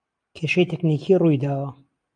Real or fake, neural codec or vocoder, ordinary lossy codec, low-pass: real; none; AAC, 64 kbps; 9.9 kHz